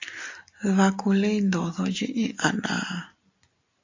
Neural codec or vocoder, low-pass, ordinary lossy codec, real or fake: none; 7.2 kHz; AAC, 48 kbps; real